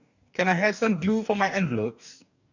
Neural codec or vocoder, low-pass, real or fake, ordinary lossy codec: codec, 44.1 kHz, 2.6 kbps, DAC; 7.2 kHz; fake; none